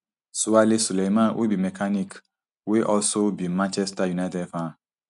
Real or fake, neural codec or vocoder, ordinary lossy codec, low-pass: real; none; none; 10.8 kHz